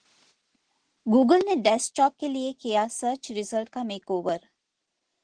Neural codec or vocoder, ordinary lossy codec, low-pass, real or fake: none; Opus, 16 kbps; 9.9 kHz; real